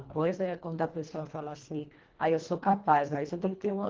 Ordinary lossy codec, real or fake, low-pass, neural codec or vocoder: Opus, 16 kbps; fake; 7.2 kHz; codec, 24 kHz, 1.5 kbps, HILCodec